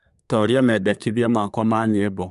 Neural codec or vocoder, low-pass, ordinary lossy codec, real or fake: codec, 24 kHz, 1 kbps, SNAC; 10.8 kHz; none; fake